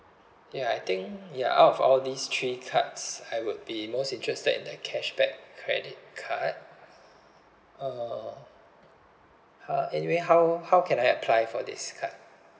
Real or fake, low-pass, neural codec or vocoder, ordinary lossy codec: real; none; none; none